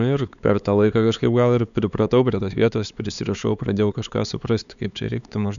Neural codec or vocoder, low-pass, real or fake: codec, 16 kHz, 4 kbps, X-Codec, HuBERT features, trained on LibriSpeech; 7.2 kHz; fake